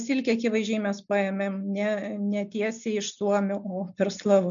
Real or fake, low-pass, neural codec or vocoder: real; 7.2 kHz; none